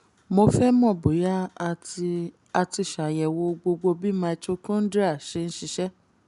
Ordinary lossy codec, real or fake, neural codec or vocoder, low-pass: none; real; none; 10.8 kHz